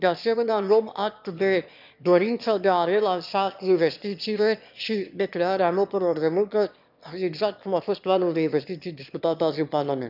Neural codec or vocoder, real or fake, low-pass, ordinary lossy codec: autoencoder, 22.05 kHz, a latent of 192 numbers a frame, VITS, trained on one speaker; fake; 5.4 kHz; none